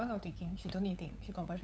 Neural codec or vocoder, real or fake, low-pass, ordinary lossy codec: codec, 16 kHz, 8 kbps, FunCodec, trained on LibriTTS, 25 frames a second; fake; none; none